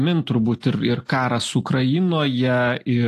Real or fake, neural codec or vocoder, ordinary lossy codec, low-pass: real; none; AAC, 48 kbps; 14.4 kHz